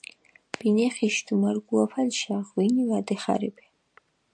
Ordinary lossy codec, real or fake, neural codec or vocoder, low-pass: AAC, 64 kbps; real; none; 9.9 kHz